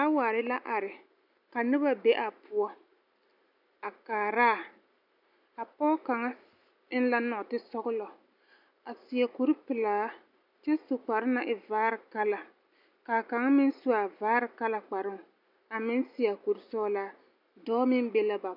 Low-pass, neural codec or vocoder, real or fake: 5.4 kHz; none; real